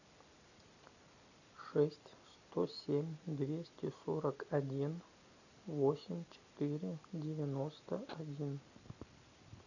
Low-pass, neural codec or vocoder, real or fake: 7.2 kHz; none; real